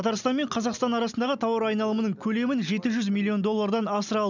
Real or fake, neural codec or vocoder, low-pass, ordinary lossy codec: real; none; 7.2 kHz; none